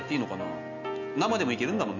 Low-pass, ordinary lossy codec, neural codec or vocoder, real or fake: 7.2 kHz; none; none; real